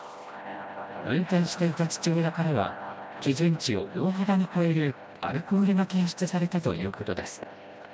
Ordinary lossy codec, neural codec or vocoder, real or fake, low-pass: none; codec, 16 kHz, 1 kbps, FreqCodec, smaller model; fake; none